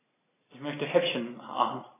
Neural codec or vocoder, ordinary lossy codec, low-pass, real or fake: none; AAC, 16 kbps; 3.6 kHz; real